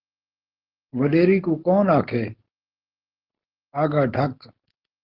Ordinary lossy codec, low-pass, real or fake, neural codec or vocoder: Opus, 16 kbps; 5.4 kHz; real; none